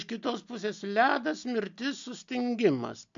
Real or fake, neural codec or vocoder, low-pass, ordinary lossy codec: real; none; 7.2 kHz; MP3, 64 kbps